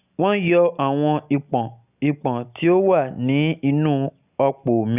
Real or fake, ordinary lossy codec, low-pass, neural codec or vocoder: real; none; 3.6 kHz; none